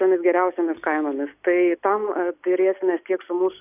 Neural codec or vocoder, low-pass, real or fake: none; 3.6 kHz; real